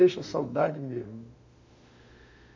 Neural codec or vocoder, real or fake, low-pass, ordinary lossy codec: autoencoder, 48 kHz, 32 numbers a frame, DAC-VAE, trained on Japanese speech; fake; 7.2 kHz; MP3, 64 kbps